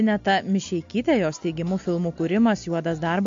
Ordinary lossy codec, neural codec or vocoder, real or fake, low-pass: MP3, 48 kbps; none; real; 7.2 kHz